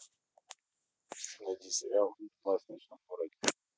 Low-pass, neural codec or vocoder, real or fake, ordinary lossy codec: none; none; real; none